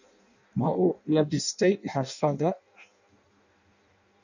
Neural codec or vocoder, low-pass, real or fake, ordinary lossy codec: codec, 16 kHz in and 24 kHz out, 0.6 kbps, FireRedTTS-2 codec; 7.2 kHz; fake; none